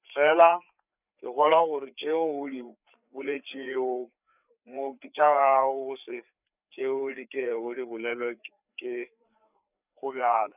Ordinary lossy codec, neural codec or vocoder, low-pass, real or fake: none; codec, 16 kHz, 4 kbps, FreqCodec, larger model; 3.6 kHz; fake